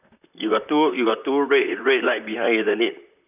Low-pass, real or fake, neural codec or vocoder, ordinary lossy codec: 3.6 kHz; fake; vocoder, 44.1 kHz, 128 mel bands, Pupu-Vocoder; none